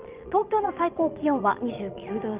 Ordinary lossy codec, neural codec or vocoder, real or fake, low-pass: Opus, 32 kbps; vocoder, 22.05 kHz, 80 mel bands, Vocos; fake; 3.6 kHz